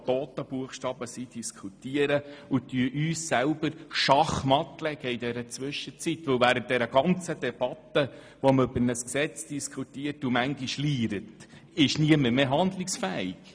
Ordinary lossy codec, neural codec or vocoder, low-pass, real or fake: none; none; none; real